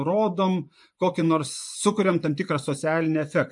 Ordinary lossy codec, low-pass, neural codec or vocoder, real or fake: MP3, 64 kbps; 10.8 kHz; vocoder, 24 kHz, 100 mel bands, Vocos; fake